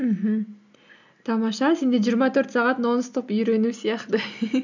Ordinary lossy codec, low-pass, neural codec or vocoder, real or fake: none; 7.2 kHz; none; real